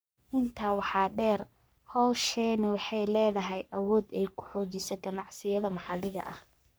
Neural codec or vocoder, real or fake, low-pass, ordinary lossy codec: codec, 44.1 kHz, 3.4 kbps, Pupu-Codec; fake; none; none